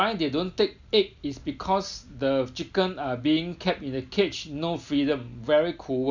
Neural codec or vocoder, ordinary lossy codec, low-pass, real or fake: none; none; 7.2 kHz; real